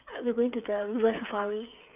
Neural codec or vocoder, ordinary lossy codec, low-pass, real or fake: codec, 16 kHz, 8 kbps, FreqCodec, smaller model; none; 3.6 kHz; fake